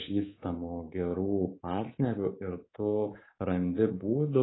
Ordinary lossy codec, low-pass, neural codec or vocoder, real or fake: AAC, 16 kbps; 7.2 kHz; codec, 24 kHz, 3.1 kbps, DualCodec; fake